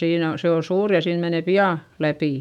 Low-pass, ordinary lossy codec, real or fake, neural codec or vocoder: 19.8 kHz; none; fake; autoencoder, 48 kHz, 128 numbers a frame, DAC-VAE, trained on Japanese speech